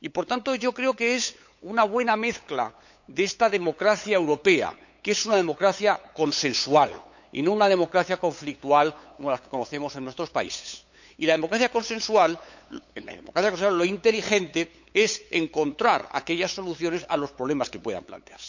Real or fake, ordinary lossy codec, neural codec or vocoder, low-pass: fake; none; codec, 16 kHz, 8 kbps, FunCodec, trained on LibriTTS, 25 frames a second; 7.2 kHz